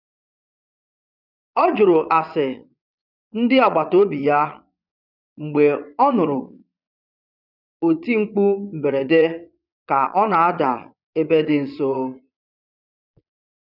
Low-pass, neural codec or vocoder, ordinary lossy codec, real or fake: 5.4 kHz; vocoder, 22.05 kHz, 80 mel bands, Vocos; none; fake